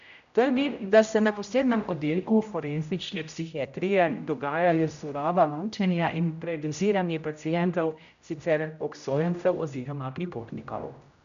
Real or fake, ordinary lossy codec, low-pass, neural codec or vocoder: fake; none; 7.2 kHz; codec, 16 kHz, 0.5 kbps, X-Codec, HuBERT features, trained on general audio